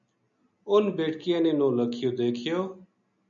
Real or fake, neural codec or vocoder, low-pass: real; none; 7.2 kHz